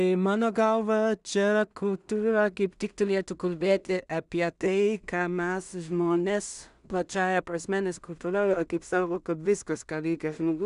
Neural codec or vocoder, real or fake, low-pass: codec, 16 kHz in and 24 kHz out, 0.4 kbps, LongCat-Audio-Codec, two codebook decoder; fake; 10.8 kHz